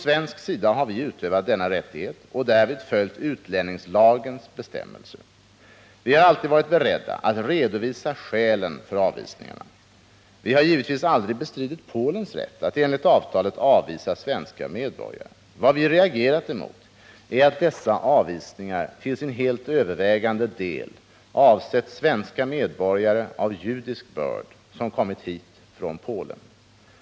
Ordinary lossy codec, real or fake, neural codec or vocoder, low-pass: none; real; none; none